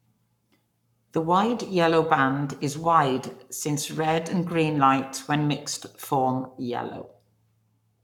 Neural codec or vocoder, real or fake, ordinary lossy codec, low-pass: codec, 44.1 kHz, 7.8 kbps, Pupu-Codec; fake; none; 19.8 kHz